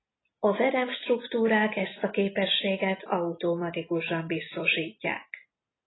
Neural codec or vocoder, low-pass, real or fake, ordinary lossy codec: none; 7.2 kHz; real; AAC, 16 kbps